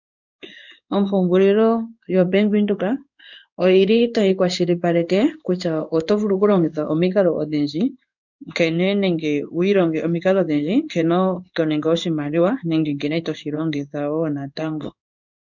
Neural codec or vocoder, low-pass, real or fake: codec, 16 kHz in and 24 kHz out, 1 kbps, XY-Tokenizer; 7.2 kHz; fake